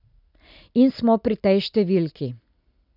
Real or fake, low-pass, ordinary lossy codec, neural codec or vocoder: real; 5.4 kHz; none; none